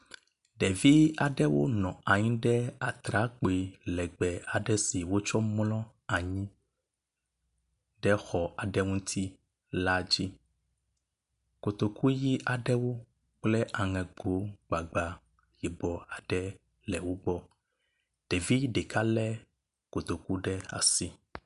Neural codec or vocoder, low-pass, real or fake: none; 10.8 kHz; real